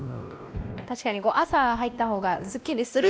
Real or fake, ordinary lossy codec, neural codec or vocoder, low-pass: fake; none; codec, 16 kHz, 1 kbps, X-Codec, WavLM features, trained on Multilingual LibriSpeech; none